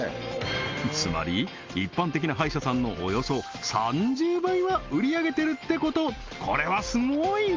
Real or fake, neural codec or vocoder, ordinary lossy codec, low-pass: real; none; Opus, 32 kbps; 7.2 kHz